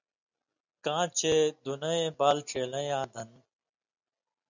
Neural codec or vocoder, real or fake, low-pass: none; real; 7.2 kHz